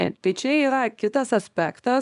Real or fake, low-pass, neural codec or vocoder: fake; 10.8 kHz; codec, 24 kHz, 0.9 kbps, WavTokenizer, small release